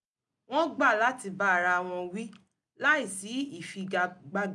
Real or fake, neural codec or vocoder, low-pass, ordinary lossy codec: real; none; 10.8 kHz; none